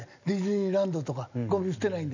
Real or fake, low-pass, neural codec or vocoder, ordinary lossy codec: real; 7.2 kHz; none; none